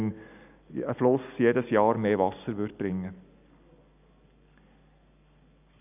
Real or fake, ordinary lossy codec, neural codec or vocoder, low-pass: real; none; none; 3.6 kHz